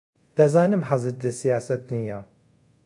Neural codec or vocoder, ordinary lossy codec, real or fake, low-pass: codec, 24 kHz, 0.5 kbps, DualCodec; MP3, 64 kbps; fake; 10.8 kHz